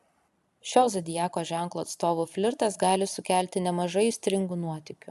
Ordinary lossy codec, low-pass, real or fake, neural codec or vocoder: AAC, 96 kbps; 14.4 kHz; real; none